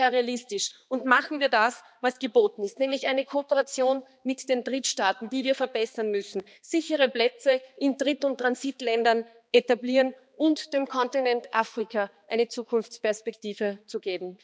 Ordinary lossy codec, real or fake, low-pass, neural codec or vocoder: none; fake; none; codec, 16 kHz, 2 kbps, X-Codec, HuBERT features, trained on balanced general audio